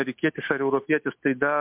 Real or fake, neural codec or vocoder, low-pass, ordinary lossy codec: real; none; 3.6 kHz; MP3, 32 kbps